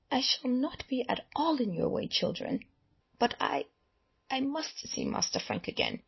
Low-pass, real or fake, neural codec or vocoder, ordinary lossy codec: 7.2 kHz; real; none; MP3, 24 kbps